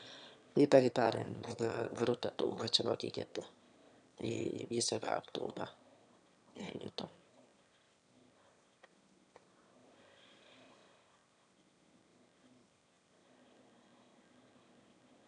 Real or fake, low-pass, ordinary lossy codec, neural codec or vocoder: fake; 9.9 kHz; none; autoencoder, 22.05 kHz, a latent of 192 numbers a frame, VITS, trained on one speaker